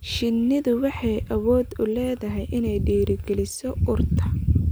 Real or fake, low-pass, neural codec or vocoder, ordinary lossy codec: real; none; none; none